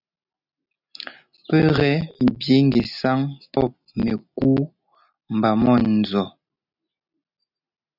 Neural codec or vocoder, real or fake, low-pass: none; real; 5.4 kHz